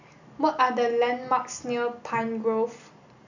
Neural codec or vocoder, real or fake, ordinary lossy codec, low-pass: none; real; none; 7.2 kHz